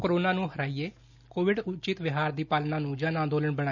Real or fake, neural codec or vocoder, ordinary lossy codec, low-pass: real; none; none; 7.2 kHz